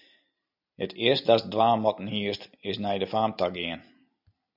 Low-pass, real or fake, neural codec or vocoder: 5.4 kHz; real; none